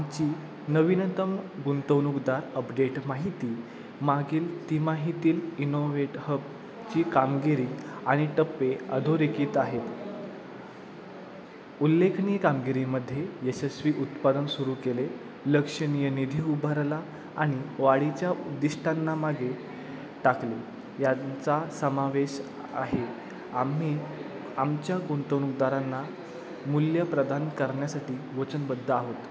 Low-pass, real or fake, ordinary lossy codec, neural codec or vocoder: none; real; none; none